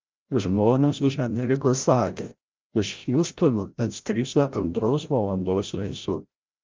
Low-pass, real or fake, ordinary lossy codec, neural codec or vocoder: 7.2 kHz; fake; Opus, 32 kbps; codec, 16 kHz, 0.5 kbps, FreqCodec, larger model